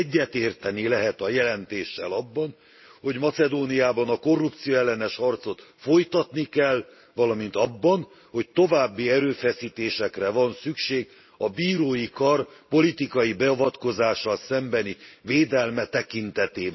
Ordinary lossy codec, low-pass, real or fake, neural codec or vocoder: MP3, 24 kbps; 7.2 kHz; real; none